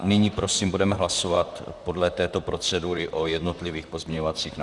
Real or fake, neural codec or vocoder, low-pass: fake; vocoder, 44.1 kHz, 128 mel bands, Pupu-Vocoder; 10.8 kHz